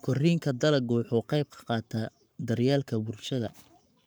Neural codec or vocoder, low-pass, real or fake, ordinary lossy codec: codec, 44.1 kHz, 7.8 kbps, Pupu-Codec; none; fake; none